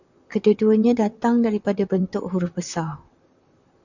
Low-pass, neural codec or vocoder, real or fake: 7.2 kHz; vocoder, 44.1 kHz, 128 mel bands, Pupu-Vocoder; fake